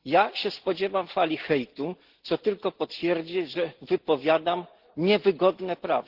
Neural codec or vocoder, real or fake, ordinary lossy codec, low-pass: none; real; Opus, 16 kbps; 5.4 kHz